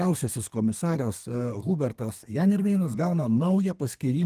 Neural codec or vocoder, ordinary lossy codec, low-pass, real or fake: codec, 32 kHz, 1.9 kbps, SNAC; Opus, 24 kbps; 14.4 kHz; fake